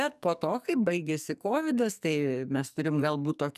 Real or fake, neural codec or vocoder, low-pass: fake; codec, 44.1 kHz, 2.6 kbps, SNAC; 14.4 kHz